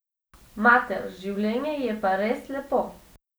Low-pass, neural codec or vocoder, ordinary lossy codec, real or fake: none; none; none; real